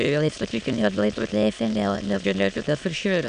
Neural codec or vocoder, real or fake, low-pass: autoencoder, 22.05 kHz, a latent of 192 numbers a frame, VITS, trained on many speakers; fake; 9.9 kHz